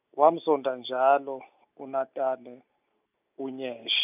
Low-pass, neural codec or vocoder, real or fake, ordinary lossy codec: 3.6 kHz; none; real; none